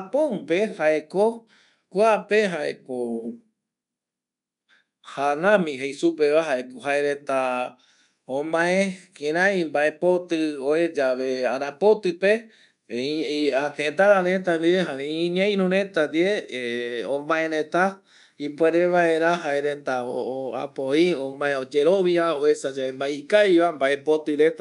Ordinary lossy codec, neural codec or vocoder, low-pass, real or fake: none; codec, 24 kHz, 1.2 kbps, DualCodec; 10.8 kHz; fake